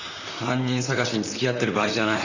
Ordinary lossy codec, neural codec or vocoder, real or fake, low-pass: none; vocoder, 44.1 kHz, 128 mel bands, Pupu-Vocoder; fake; 7.2 kHz